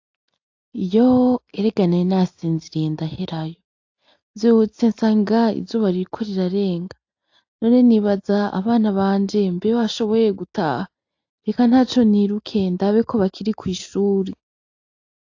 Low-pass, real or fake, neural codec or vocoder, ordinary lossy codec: 7.2 kHz; real; none; AAC, 48 kbps